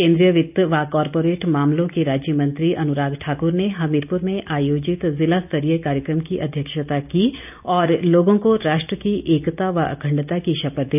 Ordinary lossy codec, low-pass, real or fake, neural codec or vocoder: none; 3.6 kHz; real; none